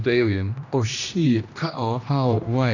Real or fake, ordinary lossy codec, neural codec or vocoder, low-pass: fake; none; codec, 16 kHz, 1 kbps, X-Codec, HuBERT features, trained on general audio; 7.2 kHz